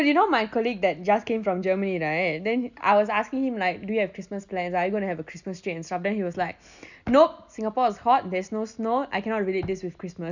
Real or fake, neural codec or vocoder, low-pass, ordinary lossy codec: real; none; 7.2 kHz; none